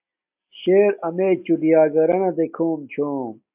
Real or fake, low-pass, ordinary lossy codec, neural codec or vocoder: real; 3.6 kHz; AAC, 32 kbps; none